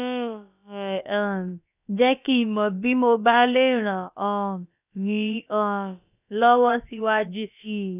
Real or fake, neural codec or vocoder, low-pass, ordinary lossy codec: fake; codec, 16 kHz, about 1 kbps, DyCAST, with the encoder's durations; 3.6 kHz; none